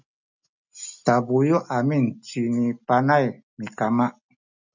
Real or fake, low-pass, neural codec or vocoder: real; 7.2 kHz; none